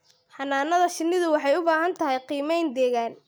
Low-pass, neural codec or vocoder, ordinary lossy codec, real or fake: none; none; none; real